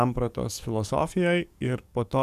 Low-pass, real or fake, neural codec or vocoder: 14.4 kHz; fake; codec, 44.1 kHz, 7.8 kbps, DAC